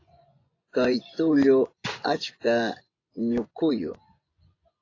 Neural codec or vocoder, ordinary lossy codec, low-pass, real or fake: vocoder, 24 kHz, 100 mel bands, Vocos; AAC, 32 kbps; 7.2 kHz; fake